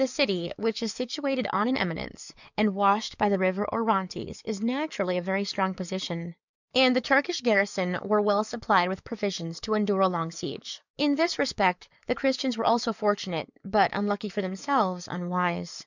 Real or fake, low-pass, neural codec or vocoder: fake; 7.2 kHz; codec, 44.1 kHz, 7.8 kbps, DAC